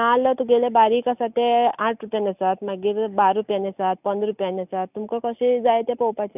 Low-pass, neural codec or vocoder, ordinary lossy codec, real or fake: 3.6 kHz; none; none; real